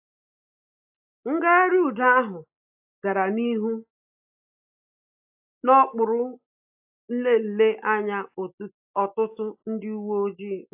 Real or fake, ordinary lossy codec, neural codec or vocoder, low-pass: real; AAC, 32 kbps; none; 3.6 kHz